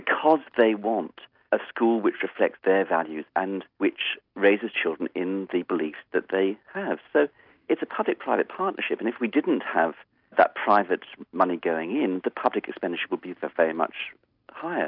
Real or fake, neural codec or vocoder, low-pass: real; none; 5.4 kHz